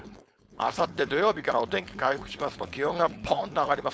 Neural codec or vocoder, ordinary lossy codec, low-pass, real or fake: codec, 16 kHz, 4.8 kbps, FACodec; none; none; fake